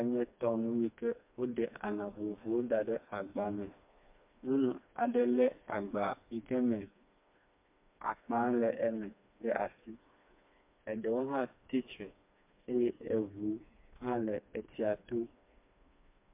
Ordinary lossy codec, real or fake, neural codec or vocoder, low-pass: MP3, 32 kbps; fake; codec, 16 kHz, 2 kbps, FreqCodec, smaller model; 3.6 kHz